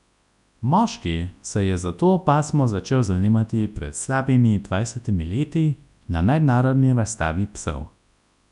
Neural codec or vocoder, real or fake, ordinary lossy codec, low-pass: codec, 24 kHz, 0.9 kbps, WavTokenizer, large speech release; fake; none; 10.8 kHz